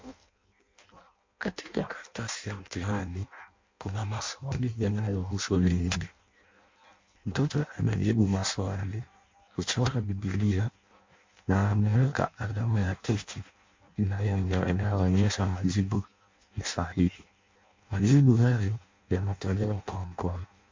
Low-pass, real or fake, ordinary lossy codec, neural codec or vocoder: 7.2 kHz; fake; MP3, 48 kbps; codec, 16 kHz in and 24 kHz out, 0.6 kbps, FireRedTTS-2 codec